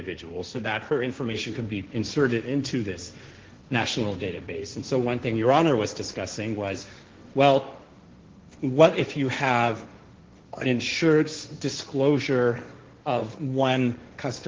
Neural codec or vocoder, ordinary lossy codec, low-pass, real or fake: codec, 16 kHz, 1.1 kbps, Voila-Tokenizer; Opus, 16 kbps; 7.2 kHz; fake